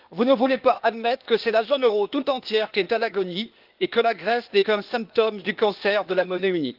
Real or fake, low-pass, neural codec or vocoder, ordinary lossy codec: fake; 5.4 kHz; codec, 16 kHz, 0.8 kbps, ZipCodec; Opus, 32 kbps